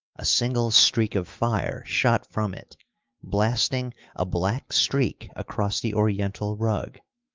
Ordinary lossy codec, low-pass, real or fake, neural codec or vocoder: Opus, 32 kbps; 7.2 kHz; fake; autoencoder, 48 kHz, 128 numbers a frame, DAC-VAE, trained on Japanese speech